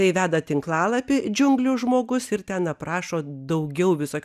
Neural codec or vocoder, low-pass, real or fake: none; 14.4 kHz; real